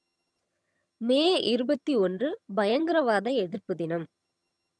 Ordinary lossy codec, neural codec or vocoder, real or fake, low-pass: none; vocoder, 22.05 kHz, 80 mel bands, HiFi-GAN; fake; none